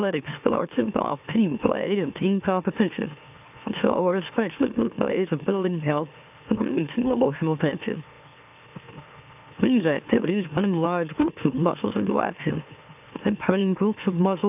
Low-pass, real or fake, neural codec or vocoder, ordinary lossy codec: 3.6 kHz; fake; autoencoder, 44.1 kHz, a latent of 192 numbers a frame, MeloTTS; AAC, 32 kbps